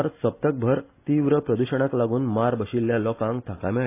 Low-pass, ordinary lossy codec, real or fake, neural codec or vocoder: 3.6 kHz; AAC, 24 kbps; real; none